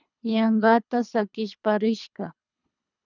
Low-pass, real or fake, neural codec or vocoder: 7.2 kHz; fake; codec, 24 kHz, 3 kbps, HILCodec